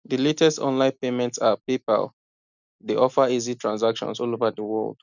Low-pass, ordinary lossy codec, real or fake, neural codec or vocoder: 7.2 kHz; none; real; none